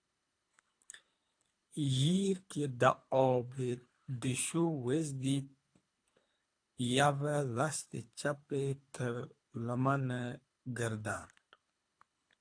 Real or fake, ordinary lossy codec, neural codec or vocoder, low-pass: fake; AAC, 48 kbps; codec, 24 kHz, 3 kbps, HILCodec; 9.9 kHz